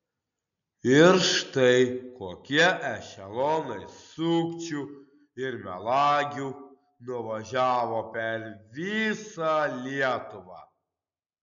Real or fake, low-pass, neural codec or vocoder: real; 7.2 kHz; none